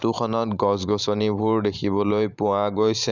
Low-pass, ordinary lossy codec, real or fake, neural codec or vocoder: 7.2 kHz; none; fake; vocoder, 44.1 kHz, 128 mel bands every 512 samples, BigVGAN v2